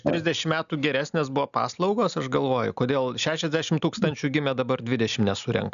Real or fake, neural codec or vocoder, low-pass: real; none; 7.2 kHz